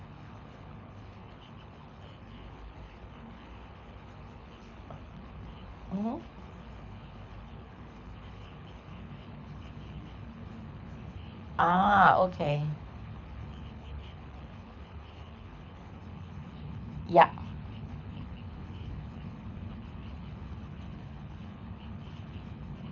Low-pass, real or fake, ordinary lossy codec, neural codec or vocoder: 7.2 kHz; fake; none; codec, 24 kHz, 6 kbps, HILCodec